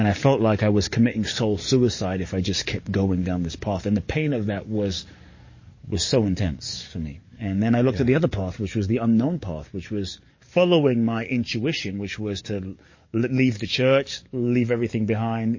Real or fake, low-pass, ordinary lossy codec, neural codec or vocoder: fake; 7.2 kHz; MP3, 32 kbps; codec, 44.1 kHz, 7.8 kbps, DAC